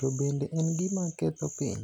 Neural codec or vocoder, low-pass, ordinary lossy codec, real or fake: none; 19.8 kHz; none; real